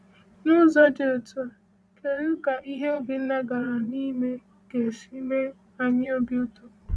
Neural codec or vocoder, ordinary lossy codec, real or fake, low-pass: vocoder, 22.05 kHz, 80 mel bands, Vocos; none; fake; none